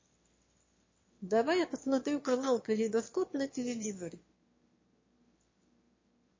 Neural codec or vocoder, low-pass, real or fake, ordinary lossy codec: autoencoder, 22.05 kHz, a latent of 192 numbers a frame, VITS, trained on one speaker; 7.2 kHz; fake; MP3, 32 kbps